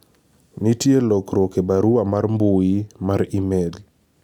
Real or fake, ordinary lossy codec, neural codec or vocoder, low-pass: real; none; none; 19.8 kHz